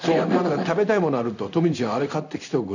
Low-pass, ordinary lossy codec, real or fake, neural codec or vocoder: 7.2 kHz; none; fake; codec, 16 kHz in and 24 kHz out, 1 kbps, XY-Tokenizer